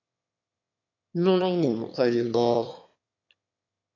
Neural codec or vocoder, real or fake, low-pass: autoencoder, 22.05 kHz, a latent of 192 numbers a frame, VITS, trained on one speaker; fake; 7.2 kHz